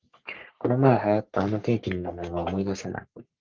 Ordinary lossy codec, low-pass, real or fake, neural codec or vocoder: Opus, 32 kbps; 7.2 kHz; fake; codec, 44.1 kHz, 3.4 kbps, Pupu-Codec